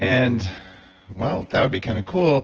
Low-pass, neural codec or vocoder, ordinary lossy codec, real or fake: 7.2 kHz; vocoder, 24 kHz, 100 mel bands, Vocos; Opus, 16 kbps; fake